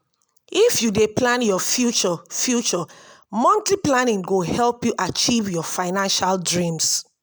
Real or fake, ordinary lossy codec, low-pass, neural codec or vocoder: real; none; none; none